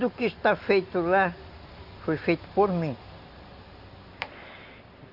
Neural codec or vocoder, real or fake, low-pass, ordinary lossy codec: none; real; 5.4 kHz; Opus, 64 kbps